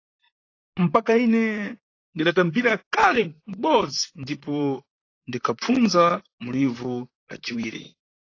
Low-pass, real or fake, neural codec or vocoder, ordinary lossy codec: 7.2 kHz; fake; vocoder, 44.1 kHz, 128 mel bands, Pupu-Vocoder; AAC, 32 kbps